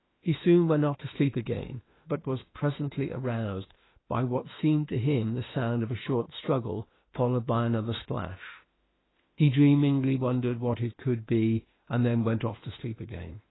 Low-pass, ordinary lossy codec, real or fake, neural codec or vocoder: 7.2 kHz; AAC, 16 kbps; fake; autoencoder, 48 kHz, 32 numbers a frame, DAC-VAE, trained on Japanese speech